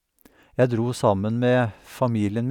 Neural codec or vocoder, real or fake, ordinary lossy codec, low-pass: none; real; none; 19.8 kHz